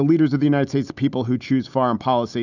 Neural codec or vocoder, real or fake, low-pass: none; real; 7.2 kHz